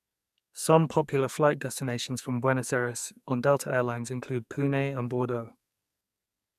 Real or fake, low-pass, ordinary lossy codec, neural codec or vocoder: fake; 14.4 kHz; none; codec, 44.1 kHz, 2.6 kbps, SNAC